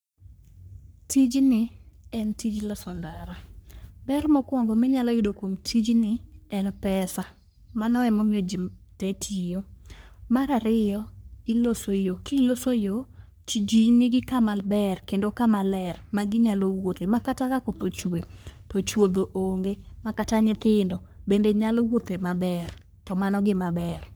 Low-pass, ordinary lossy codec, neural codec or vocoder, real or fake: none; none; codec, 44.1 kHz, 3.4 kbps, Pupu-Codec; fake